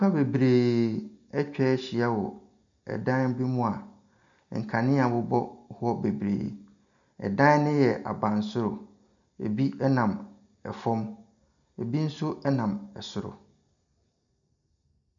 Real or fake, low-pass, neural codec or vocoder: real; 7.2 kHz; none